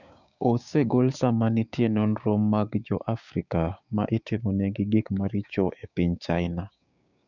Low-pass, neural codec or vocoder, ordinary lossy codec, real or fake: 7.2 kHz; codec, 16 kHz, 6 kbps, DAC; none; fake